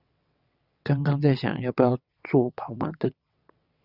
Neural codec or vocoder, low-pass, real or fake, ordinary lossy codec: vocoder, 22.05 kHz, 80 mel bands, WaveNeXt; 5.4 kHz; fake; Opus, 64 kbps